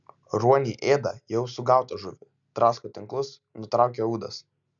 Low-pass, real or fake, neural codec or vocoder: 7.2 kHz; real; none